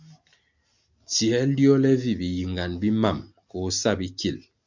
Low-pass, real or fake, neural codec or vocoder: 7.2 kHz; real; none